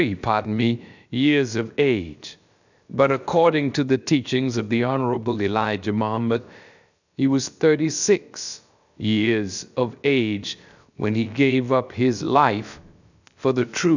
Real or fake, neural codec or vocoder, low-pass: fake; codec, 16 kHz, about 1 kbps, DyCAST, with the encoder's durations; 7.2 kHz